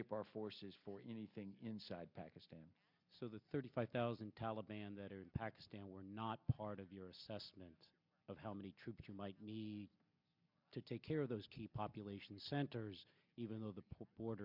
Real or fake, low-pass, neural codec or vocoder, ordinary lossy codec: real; 5.4 kHz; none; MP3, 48 kbps